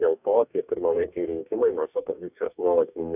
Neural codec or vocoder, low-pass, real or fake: codec, 44.1 kHz, 2.6 kbps, DAC; 3.6 kHz; fake